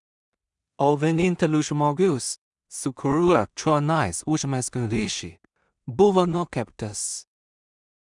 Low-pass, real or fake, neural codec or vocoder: 10.8 kHz; fake; codec, 16 kHz in and 24 kHz out, 0.4 kbps, LongCat-Audio-Codec, two codebook decoder